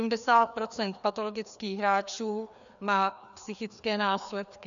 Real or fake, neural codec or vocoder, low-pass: fake; codec, 16 kHz, 2 kbps, FreqCodec, larger model; 7.2 kHz